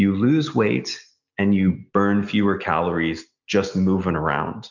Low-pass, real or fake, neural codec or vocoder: 7.2 kHz; real; none